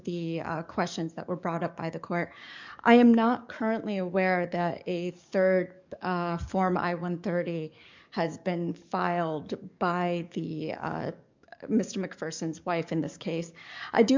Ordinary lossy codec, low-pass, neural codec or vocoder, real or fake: MP3, 64 kbps; 7.2 kHz; codec, 44.1 kHz, 7.8 kbps, DAC; fake